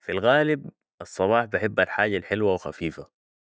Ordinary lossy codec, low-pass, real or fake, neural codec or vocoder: none; none; real; none